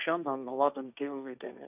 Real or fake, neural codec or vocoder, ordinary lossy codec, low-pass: fake; codec, 16 kHz, 1.1 kbps, Voila-Tokenizer; none; 3.6 kHz